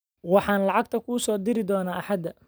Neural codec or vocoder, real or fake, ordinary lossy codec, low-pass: none; real; none; none